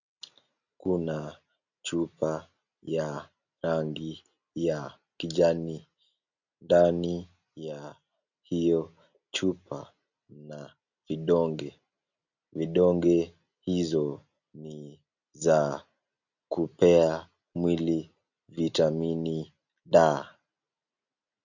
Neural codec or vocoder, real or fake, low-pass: none; real; 7.2 kHz